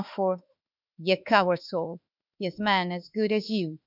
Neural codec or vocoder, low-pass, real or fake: autoencoder, 48 kHz, 32 numbers a frame, DAC-VAE, trained on Japanese speech; 5.4 kHz; fake